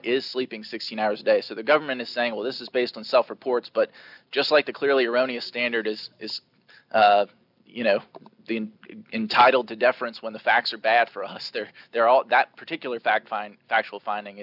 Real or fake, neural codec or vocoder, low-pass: real; none; 5.4 kHz